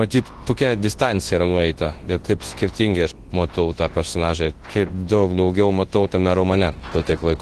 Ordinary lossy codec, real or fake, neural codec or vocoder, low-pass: Opus, 16 kbps; fake; codec, 24 kHz, 0.9 kbps, WavTokenizer, large speech release; 10.8 kHz